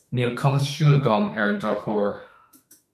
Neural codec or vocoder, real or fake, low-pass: autoencoder, 48 kHz, 32 numbers a frame, DAC-VAE, trained on Japanese speech; fake; 14.4 kHz